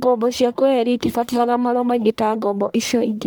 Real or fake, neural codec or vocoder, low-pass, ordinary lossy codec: fake; codec, 44.1 kHz, 1.7 kbps, Pupu-Codec; none; none